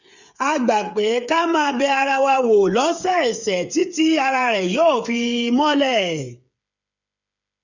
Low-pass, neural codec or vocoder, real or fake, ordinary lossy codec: 7.2 kHz; codec, 16 kHz, 8 kbps, FreqCodec, smaller model; fake; none